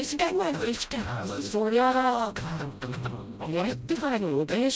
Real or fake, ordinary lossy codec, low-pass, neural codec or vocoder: fake; none; none; codec, 16 kHz, 0.5 kbps, FreqCodec, smaller model